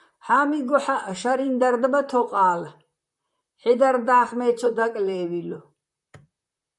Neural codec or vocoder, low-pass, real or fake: vocoder, 44.1 kHz, 128 mel bands, Pupu-Vocoder; 10.8 kHz; fake